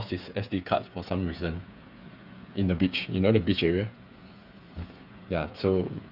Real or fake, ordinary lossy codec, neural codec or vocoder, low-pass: fake; none; codec, 16 kHz, 8 kbps, FreqCodec, smaller model; 5.4 kHz